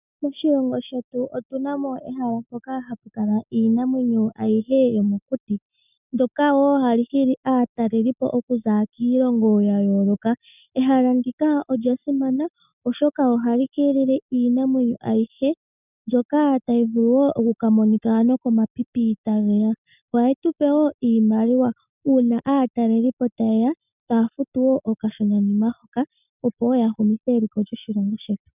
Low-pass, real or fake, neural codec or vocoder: 3.6 kHz; real; none